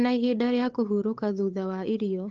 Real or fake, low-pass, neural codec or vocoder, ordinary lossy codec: fake; 7.2 kHz; codec, 16 kHz, 8 kbps, FunCodec, trained on Chinese and English, 25 frames a second; Opus, 16 kbps